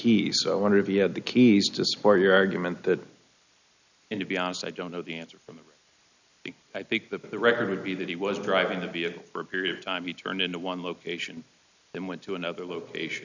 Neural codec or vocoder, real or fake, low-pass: none; real; 7.2 kHz